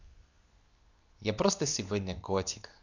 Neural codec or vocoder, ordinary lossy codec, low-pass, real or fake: codec, 24 kHz, 0.9 kbps, WavTokenizer, medium speech release version 2; none; 7.2 kHz; fake